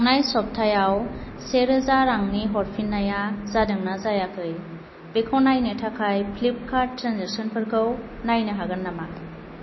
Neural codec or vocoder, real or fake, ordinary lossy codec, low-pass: none; real; MP3, 24 kbps; 7.2 kHz